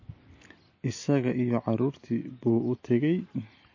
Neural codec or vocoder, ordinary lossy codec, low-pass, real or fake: none; MP3, 32 kbps; 7.2 kHz; real